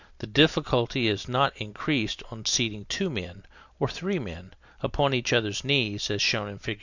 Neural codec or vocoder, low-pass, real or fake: none; 7.2 kHz; real